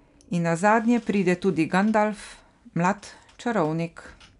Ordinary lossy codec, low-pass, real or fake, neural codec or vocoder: none; 10.8 kHz; real; none